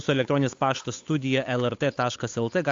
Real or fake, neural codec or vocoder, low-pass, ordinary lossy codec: fake; codec, 16 kHz, 8 kbps, FunCodec, trained on Chinese and English, 25 frames a second; 7.2 kHz; AAC, 48 kbps